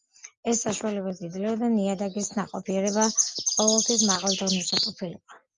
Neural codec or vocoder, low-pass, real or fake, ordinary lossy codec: none; 7.2 kHz; real; Opus, 32 kbps